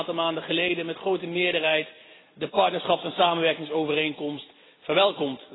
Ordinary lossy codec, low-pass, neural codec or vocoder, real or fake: AAC, 16 kbps; 7.2 kHz; none; real